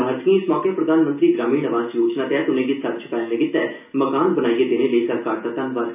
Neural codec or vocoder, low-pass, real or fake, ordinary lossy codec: none; 3.6 kHz; real; none